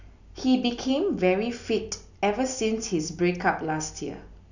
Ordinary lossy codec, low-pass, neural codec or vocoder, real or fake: none; 7.2 kHz; none; real